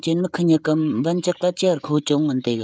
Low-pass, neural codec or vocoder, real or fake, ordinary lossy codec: none; codec, 16 kHz, 16 kbps, FreqCodec, smaller model; fake; none